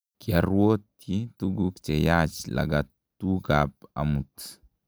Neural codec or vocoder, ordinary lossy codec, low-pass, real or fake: none; none; none; real